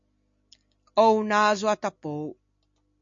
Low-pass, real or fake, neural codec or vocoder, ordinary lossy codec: 7.2 kHz; real; none; MP3, 48 kbps